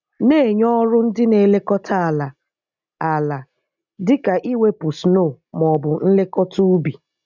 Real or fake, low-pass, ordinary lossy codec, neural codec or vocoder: real; 7.2 kHz; none; none